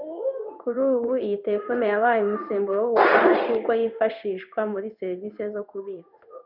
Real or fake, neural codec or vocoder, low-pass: fake; codec, 16 kHz in and 24 kHz out, 1 kbps, XY-Tokenizer; 5.4 kHz